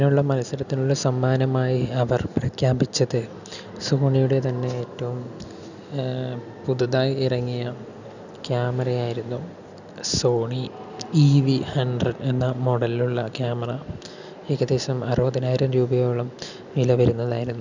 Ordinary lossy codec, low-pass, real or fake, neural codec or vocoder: none; 7.2 kHz; real; none